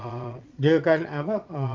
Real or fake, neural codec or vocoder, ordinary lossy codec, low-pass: fake; vocoder, 22.05 kHz, 80 mel bands, Vocos; Opus, 32 kbps; 7.2 kHz